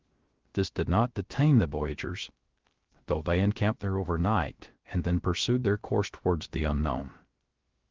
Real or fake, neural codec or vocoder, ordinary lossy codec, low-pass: fake; codec, 16 kHz, 0.3 kbps, FocalCodec; Opus, 16 kbps; 7.2 kHz